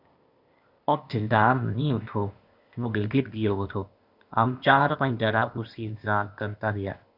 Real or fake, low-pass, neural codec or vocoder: fake; 5.4 kHz; codec, 16 kHz, 0.8 kbps, ZipCodec